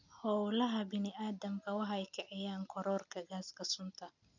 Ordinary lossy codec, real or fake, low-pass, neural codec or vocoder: none; real; 7.2 kHz; none